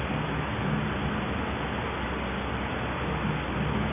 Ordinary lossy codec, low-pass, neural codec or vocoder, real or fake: none; 3.6 kHz; none; real